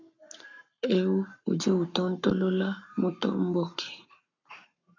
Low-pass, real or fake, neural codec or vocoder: 7.2 kHz; fake; codec, 44.1 kHz, 7.8 kbps, Pupu-Codec